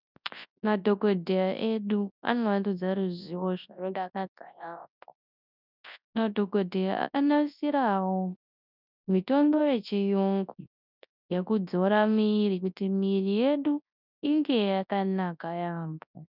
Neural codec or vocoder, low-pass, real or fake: codec, 24 kHz, 0.9 kbps, WavTokenizer, large speech release; 5.4 kHz; fake